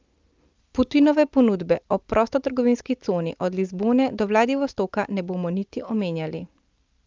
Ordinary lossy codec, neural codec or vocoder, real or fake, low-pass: Opus, 32 kbps; none; real; 7.2 kHz